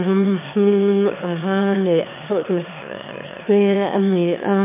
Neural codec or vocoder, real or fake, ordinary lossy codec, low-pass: autoencoder, 22.05 kHz, a latent of 192 numbers a frame, VITS, trained on one speaker; fake; MP3, 32 kbps; 3.6 kHz